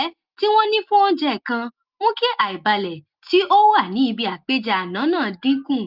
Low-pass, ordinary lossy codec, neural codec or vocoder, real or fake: 5.4 kHz; Opus, 32 kbps; none; real